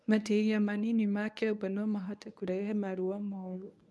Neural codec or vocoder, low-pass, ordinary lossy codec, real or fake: codec, 24 kHz, 0.9 kbps, WavTokenizer, medium speech release version 1; none; none; fake